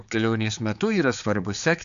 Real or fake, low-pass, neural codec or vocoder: fake; 7.2 kHz; codec, 16 kHz, 4 kbps, X-Codec, HuBERT features, trained on general audio